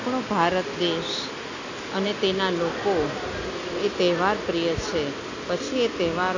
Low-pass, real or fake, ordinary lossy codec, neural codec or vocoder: 7.2 kHz; real; AAC, 48 kbps; none